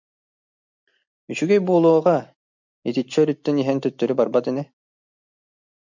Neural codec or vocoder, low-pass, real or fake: none; 7.2 kHz; real